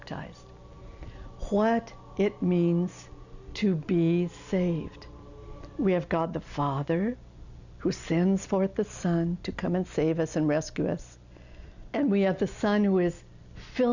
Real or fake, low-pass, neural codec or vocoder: real; 7.2 kHz; none